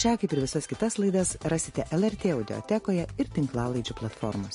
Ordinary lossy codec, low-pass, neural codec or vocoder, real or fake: MP3, 48 kbps; 10.8 kHz; none; real